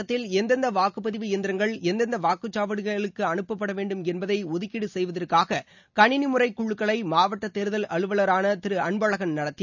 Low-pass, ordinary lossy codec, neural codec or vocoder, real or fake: 7.2 kHz; none; none; real